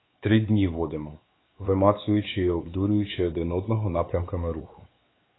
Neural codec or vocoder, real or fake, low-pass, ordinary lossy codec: codec, 16 kHz, 4 kbps, X-Codec, WavLM features, trained on Multilingual LibriSpeech; fake; 7.2 kHz; AAC, 16 kbps